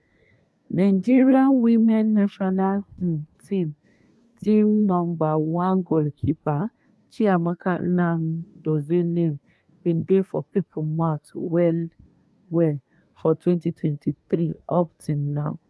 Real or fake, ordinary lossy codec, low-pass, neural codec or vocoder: fake; none; none; codec, 24 kHz, 1 kbps, SNAC